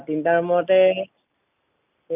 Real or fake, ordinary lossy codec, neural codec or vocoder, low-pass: real; none; none; 3.6 kHz